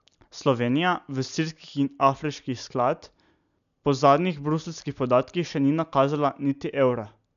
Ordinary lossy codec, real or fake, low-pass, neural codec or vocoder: none; real; 7.2 kHz; none